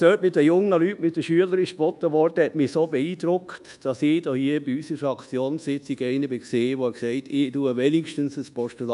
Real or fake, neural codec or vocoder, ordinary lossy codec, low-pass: fake; codec, 24 kHz, 1.2 kbps, DualCodec; none; 10.8 kHz